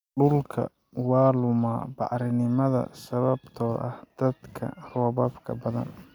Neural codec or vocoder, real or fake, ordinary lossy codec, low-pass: none; real; none; 19.8 kHz